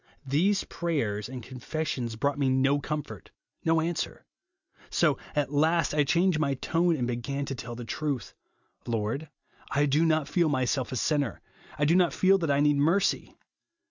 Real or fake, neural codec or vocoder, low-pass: real; none; 7.2 kHz